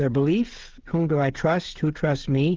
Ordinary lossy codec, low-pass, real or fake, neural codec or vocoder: Opus, 16 kbps; 7.2 kHz; fake; codec, 16 kHz, 16 kbps, FreqCodec, smaller model